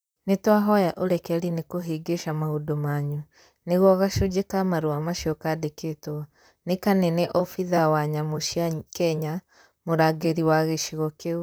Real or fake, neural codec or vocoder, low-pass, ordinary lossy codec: fake; vocoder, 44.1 kHz, 128 mel bands, Pupu-Vocoder; none; none